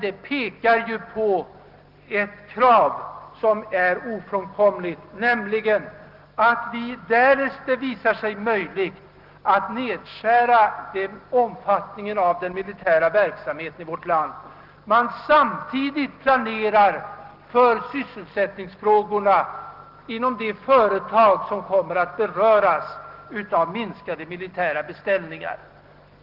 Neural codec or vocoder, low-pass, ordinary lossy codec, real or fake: none; 5.4 kHz; Opus, 16 kbps; real